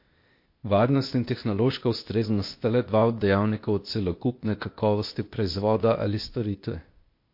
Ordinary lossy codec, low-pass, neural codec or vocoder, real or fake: MP3, 32 kbps; 5.4 kHz; codec, 16 kHz, 0.8 kbps, ZipCodec; fake